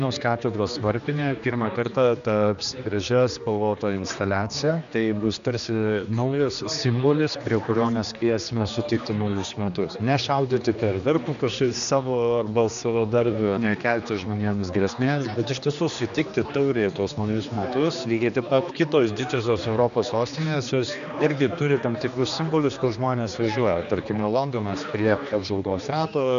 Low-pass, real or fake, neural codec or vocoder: 7.2 kHz; fake; codec, 16 kHz, 2 kbps, X-Codec, HuBERT features, trained on general audio